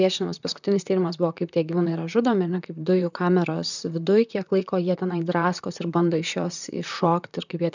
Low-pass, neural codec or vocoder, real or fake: 7.2 kHz; vocoder, 44.1 kHz, 128 mel bands, Pupu-Vocoder; fake